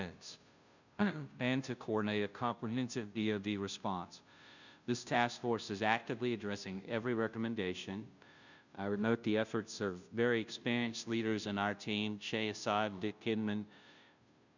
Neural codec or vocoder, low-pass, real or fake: codec, 16 kHz, 0.5 kbps, FunCodec, trained on Chinese and English, 25 frames a second; 7.2 kHz; fake